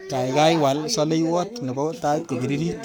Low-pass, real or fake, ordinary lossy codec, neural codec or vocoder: none; fake; none; codec, 44.1 kHz, 7.8 kbps, Pupu-Codec